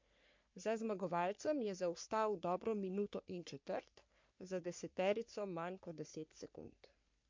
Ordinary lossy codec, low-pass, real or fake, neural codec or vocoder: MP3, 48 kbps; 7.2 kHz; fake; codec, 44.1 kHz, 3.4 kbps, Pupu-Codec